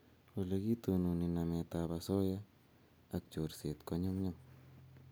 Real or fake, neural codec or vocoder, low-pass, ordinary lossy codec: real; none; none; none